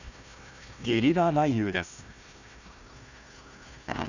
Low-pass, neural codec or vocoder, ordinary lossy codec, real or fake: 7.2 kHz; codec, 16 kHz, 1 kbps, FunCodec, trained on Chinese and English, 50 frames a second; none; fake